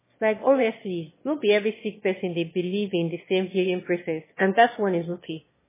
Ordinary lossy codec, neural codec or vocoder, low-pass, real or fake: MP3, 16 kbps; autoencoder, 22.05 kHz, a latent of 192 numbers a frame, VITS, trained on one speaker; 3.6 kHz; fake